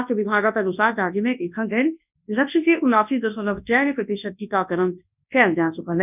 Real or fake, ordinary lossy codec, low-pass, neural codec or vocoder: fake; none; 3.6 kHz; codec, 24 kHz, 0.9 kbps, WavTokenizer, large speech release